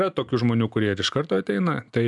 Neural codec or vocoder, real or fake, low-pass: none; real; 10.8 kHz